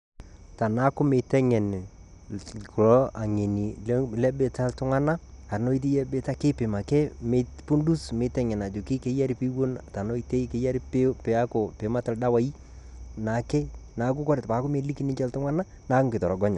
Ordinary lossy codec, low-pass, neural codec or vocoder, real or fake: none; 10.8 kHz; none; real